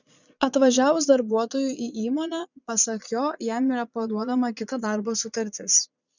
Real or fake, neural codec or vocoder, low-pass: fake; vocoder, 22.05 kHz, 80 mel bands, Vocos; 7.2 kHz